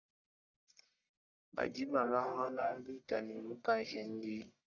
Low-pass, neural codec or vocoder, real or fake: 7.2 kHz; codec, 44.1 kHz, 1.7 kbps, Pupu-Codec; fake